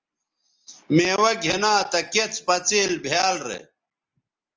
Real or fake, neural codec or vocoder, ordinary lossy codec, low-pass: real; none; Opus, 24 kbps; 7.2 kHz